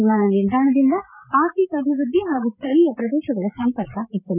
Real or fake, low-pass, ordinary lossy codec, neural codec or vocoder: fake; 3.6 kHz; none; codec, 16 kHz, 8 kbps, FreqCodec, larger model